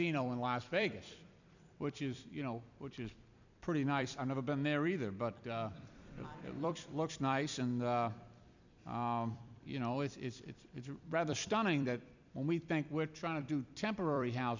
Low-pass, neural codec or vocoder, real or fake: 7.2 kHz; vocoder, 44.1 kHz, 128 mel bands every 256 samples, BigVGAN v2; fake